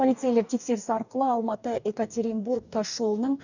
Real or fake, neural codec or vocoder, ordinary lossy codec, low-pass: fake; codec, 44.1 kHz, 2.6 kbps, DAC; none; 7.2 kHz